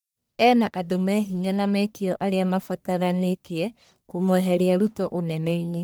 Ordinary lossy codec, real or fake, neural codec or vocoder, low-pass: none; fake; codec, 44.1 kHz, 1.7 kbps, Pupu-Codec; none